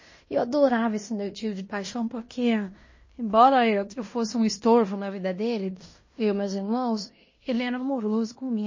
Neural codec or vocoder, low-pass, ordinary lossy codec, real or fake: codec, 16 kHz in and 24 kHz out, 0.9 kbps, LongCat-Audio-Codec, fine tuned four codebook decoder; 7.2 kHz; MP3, 32 kbps; fake